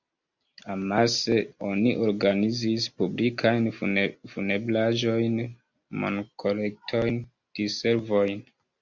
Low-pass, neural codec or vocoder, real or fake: 7.2 kHz; none; real